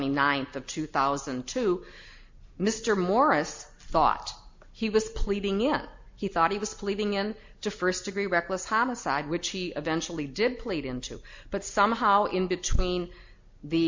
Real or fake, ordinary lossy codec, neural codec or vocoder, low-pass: real; MP3, 48 kbps; none; 7.2 kHz